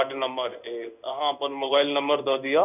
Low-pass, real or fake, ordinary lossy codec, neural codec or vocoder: 3.6 kHz; fake; none; codec, 16 kHz in and 24 kHz out, 1 kbps, XY-Tokenizer